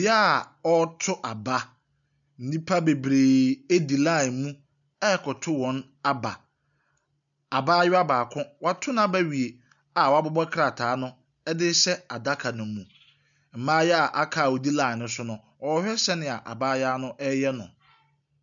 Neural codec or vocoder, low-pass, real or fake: none; 7.2 kHz; real